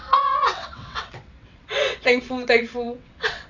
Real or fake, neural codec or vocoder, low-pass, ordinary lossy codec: fake; vocoder, 22.05 kHz, 80 mel bands, WaveNeXt; 7.2 kHz; none